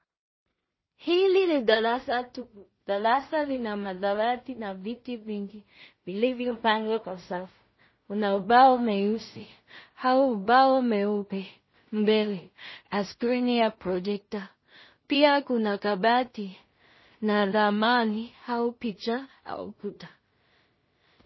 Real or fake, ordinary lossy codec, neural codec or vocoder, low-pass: fake; MP3, 24 kbps; codec, 16 kHz in and 24 kHz out, 0.4 kbps, LongCat-Audio-Codec, two codebook decoder; 7.2 kHz